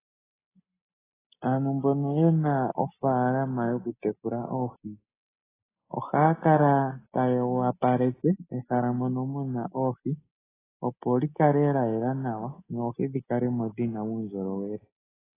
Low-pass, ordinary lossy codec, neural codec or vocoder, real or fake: 3.6 kHz; AAC, 16 kbps; none; real